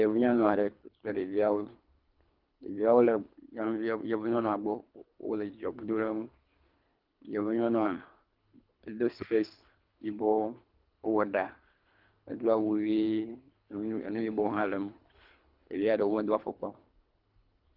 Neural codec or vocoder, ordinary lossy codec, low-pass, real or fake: codec, 24 kHz, 3 kbps, HILCodec; Opus, 32 kbps; 5.4 kHz; fake